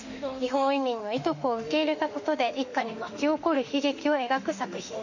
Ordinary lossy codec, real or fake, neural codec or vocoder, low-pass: none; fake; autoencoder, 48 kHz, 32 numbers a frame, DAC-VAE, trained on Japanese speech; 7.2 kHz